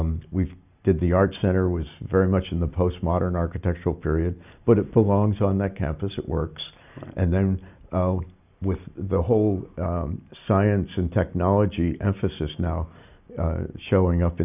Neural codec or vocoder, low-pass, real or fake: none; 3.6 kHz; real